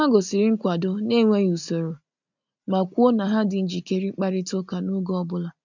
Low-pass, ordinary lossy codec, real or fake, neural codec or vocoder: 7.2 kHz; none; real; none